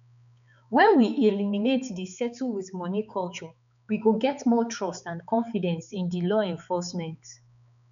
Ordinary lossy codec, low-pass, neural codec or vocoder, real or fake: none; 7.2 kHz; codec, 16 kHz, 4 kbps, X-Codec, HuBERT features, trained on balanced general audio; fake